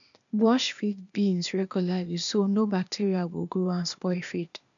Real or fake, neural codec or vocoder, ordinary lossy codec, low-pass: fake; codec, 16 kHz, 0.8 kbps, ZipCodec; none; 7.2 kHz